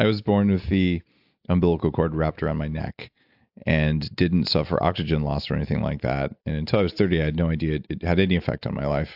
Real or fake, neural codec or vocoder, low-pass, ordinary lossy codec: real; none; 5.4 kHz; AAC, 48 kbps